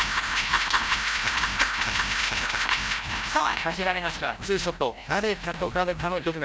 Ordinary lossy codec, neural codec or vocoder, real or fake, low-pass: none; codec, 16 kHz, 0.5 kbps, FreqCodec, larger model; fake; none